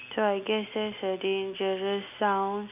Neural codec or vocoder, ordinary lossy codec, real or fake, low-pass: none; none; real; 3.6 kHz